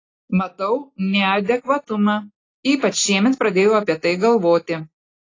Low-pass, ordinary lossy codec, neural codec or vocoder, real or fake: 7.2 kHz; AAC, 32 kbps; none; real